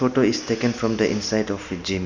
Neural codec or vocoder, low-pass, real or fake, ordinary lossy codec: none; 7.2 kHz; real; none